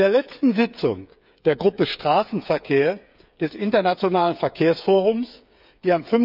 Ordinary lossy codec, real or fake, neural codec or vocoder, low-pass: none; fake; codec, 16 kHz, 8 kbps, FreqCodec, smaller model; 5.4 kHz